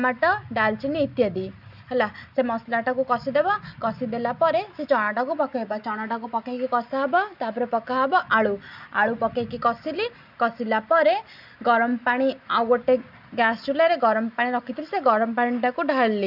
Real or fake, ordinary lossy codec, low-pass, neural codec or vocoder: real; none; 5.4 kHz; none